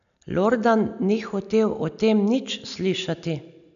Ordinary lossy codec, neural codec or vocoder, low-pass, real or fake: none; none; 7.2 kHz; real